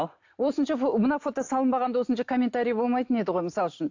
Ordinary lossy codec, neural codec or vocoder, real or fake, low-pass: AAC, 48 kbps; none; real; 7.2 kHz